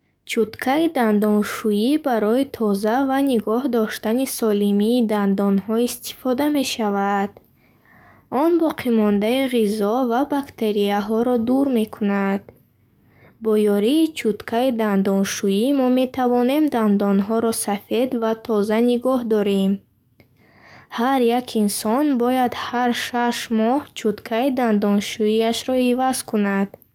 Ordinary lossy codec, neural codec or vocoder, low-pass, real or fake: none; codec, 44.1 kHz, 7.8 kbps, DAC; 19.8 kHz; fake